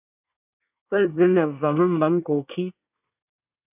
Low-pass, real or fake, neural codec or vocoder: 3.6 kHz; fake; codec, 24 kHz, 1 kbps, SNAC